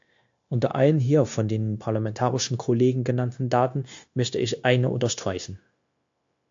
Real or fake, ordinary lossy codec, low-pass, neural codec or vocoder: fake; AAC, 64 kbps; 7.2 kHz; codec, 16 kHz, 0.9 kbps, LongCat-Audio-Codec